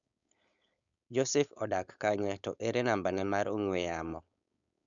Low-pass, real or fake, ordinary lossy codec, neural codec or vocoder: 7.2 kHz; fake; none; codec, 16 kHz, 4.8 kbps, FACodec